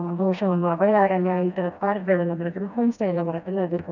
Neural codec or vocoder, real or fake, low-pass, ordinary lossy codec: codec, 16 kHz, 1 kbps, FreqCodec, smaller model; fake; 7.2 kHz; none